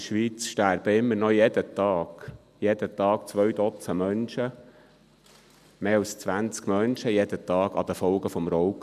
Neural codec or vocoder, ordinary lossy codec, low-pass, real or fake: none; none; 14.4 kHz; real